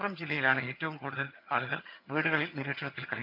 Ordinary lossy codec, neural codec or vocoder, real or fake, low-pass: none; vocoder, 22.05 kHz, 80 mel bands, HiFi-GAN; fake; 5.4 kHz